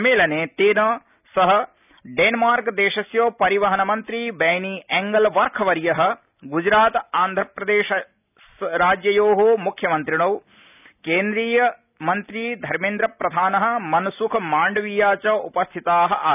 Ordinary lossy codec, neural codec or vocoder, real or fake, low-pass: none; none; real; 3.6 kHz